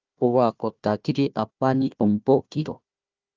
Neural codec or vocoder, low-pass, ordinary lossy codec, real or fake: codec, 16 kHz, 1 kbps, FunCodec, trained on Chinese and English, 50 frames a second; 7.2 kHz; Opus, 24 kbps; fake